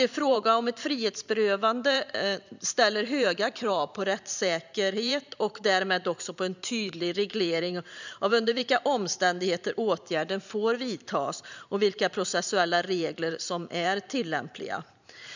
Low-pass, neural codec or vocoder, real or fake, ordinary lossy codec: 7.2 kHz; vocoder, 44.1 kHz, 128 mel bands every 512 samples, BigVGAN v2; fake; none